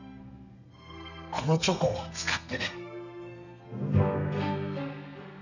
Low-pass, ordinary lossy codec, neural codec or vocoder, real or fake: 7.2 kHz; none; codec, 32 kHz, 1.9 kbps, SNAC; fake